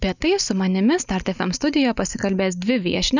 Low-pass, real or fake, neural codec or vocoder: 7.2 kHz; real; none